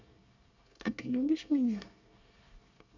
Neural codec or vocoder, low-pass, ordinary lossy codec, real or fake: codec, 24 kHz, 1 kbps, SNAC; 7.2 kHz; none; fake